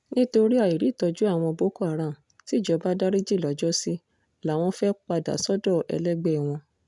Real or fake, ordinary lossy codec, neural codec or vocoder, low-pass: real; none; none; 10.8 kHz